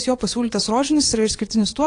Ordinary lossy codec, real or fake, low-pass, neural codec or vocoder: AAC, 48 kbps; fake; 9.9 kHz; vocoder, 22.05 kHz, 80 mel bands, Vocos